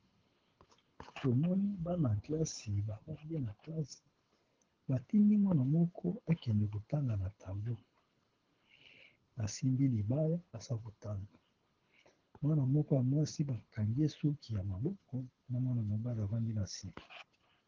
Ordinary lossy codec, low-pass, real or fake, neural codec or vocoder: Opus, 16 kbps; 7.2 kHz; fake; codec, 24 kHz, 6 kbps, HILCodec